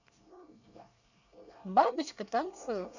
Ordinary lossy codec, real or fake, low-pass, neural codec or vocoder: none; fake; 7.2 kHz; codec, 24 kHz, 1 kbps, SNAC